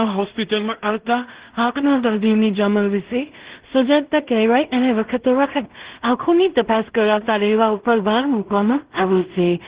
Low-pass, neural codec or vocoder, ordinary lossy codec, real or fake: 3.6 kHz; codec, 16 kHz in and 24 kHz out, 0.4 kbps, LongCat-Audio-Codec, two codebook decoder; Opus, 16 kbps; fake